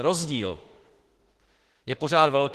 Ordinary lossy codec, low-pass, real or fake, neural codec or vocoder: Opus, 16 kbps; 14.4 kHz; fake; autoencoder, 48 kHz, 32 numbers a frame, DAC-VAE, trained on Japanese speech